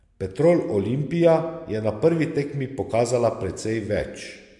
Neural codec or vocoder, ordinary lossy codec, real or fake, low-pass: none; MP3, 64 kbps; real; 10.8 kHz